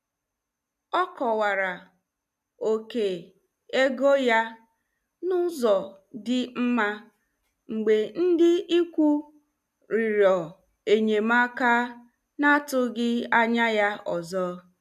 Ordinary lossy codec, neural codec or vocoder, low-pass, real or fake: none; none; 14.4 kHz; real